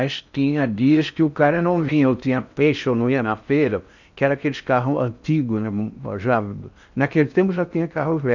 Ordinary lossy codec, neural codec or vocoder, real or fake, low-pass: none; codec, 16 kHz in and 24 kHz out, 0.6 kbps, FocalCodec, streaming, 4096 codes; fake; 7.2 kHz